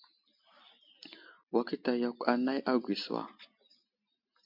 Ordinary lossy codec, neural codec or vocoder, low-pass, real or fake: MP3, 48 kbps; none; 5.4 kHz; real